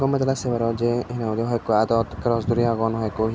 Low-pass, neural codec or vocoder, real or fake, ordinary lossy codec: none; none; real; none